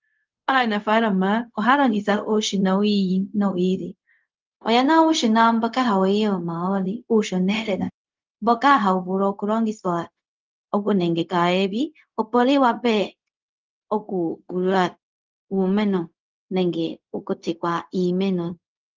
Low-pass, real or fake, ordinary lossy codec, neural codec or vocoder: 7.2 kHz; fake; Opus, 32 kbps; codec, 16 kHz, 0.4 kbps, LongCat-Audio-Codec